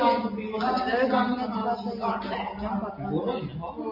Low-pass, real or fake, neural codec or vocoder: 5.4 kHz; real; none